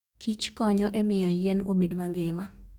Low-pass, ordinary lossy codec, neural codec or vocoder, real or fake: 19.8 kHz; none; codec, 44.1 kHz, 2.6 kbps, DAC; fake